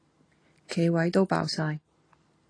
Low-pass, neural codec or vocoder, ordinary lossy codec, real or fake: 9.9 kHz; none; AAC, 32 kbps; real